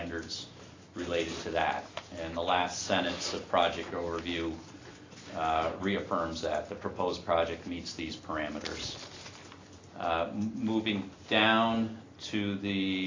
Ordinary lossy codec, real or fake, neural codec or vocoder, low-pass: AAC, 32 kbps; real; none; 7.2 kHz